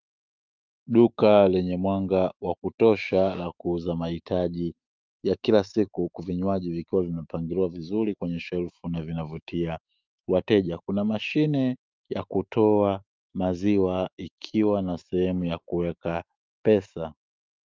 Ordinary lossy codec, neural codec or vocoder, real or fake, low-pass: Opus, 32 kbps; autoencoder, 48 kHz, 128 numbers a frame, DAC-VAE, trained on Japanese speech; fake; 7.2 kHz